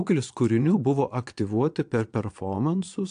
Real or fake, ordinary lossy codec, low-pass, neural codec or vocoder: fake; AAC, 64 kbps; 9.9 kHz; vocoder, 22.05 kHz, 80 mel bands, Vocos